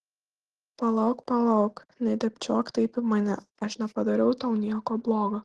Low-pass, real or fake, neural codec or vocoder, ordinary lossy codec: 10.8 kHz; real; none; Opus, 16 kbps